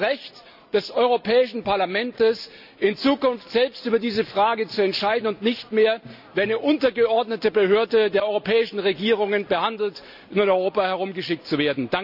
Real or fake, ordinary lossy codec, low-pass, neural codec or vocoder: real; none; 5.4 kHz; none